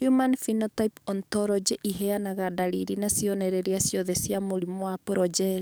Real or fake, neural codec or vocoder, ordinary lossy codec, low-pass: fake; codec, 44.1 kHz, 7.8 kbps, DAC; none; none